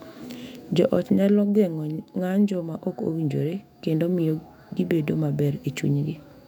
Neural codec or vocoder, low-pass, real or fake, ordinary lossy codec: autoencoder, 48 kHz, 128 numbers a frame, DAC-VAE, trained on Japanese speech; 19.8 kHz; fake; none